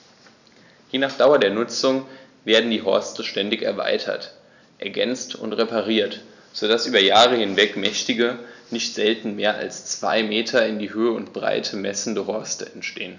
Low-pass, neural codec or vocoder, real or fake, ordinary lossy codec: 7.2 kHz; none; real; none